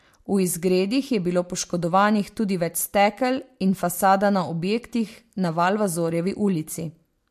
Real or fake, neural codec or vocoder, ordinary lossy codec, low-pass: real; none; MP3, 64 kbps; 14.4 kHz